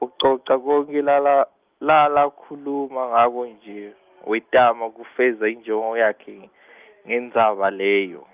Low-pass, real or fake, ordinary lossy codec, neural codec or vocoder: 3.6 kHz; real; Opus, 32 kbps; none